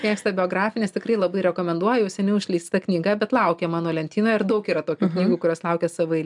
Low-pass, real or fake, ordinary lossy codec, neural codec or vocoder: 10.8 kHz; real; MP3, 96 kbps; none